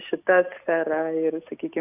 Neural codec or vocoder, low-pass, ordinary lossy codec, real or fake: none; 3.6 kHz; AAC, 32 kbps; real